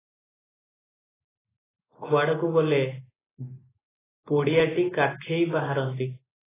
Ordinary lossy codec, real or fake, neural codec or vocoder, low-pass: AAC, 16 kbps; real; none; 3.6 kHz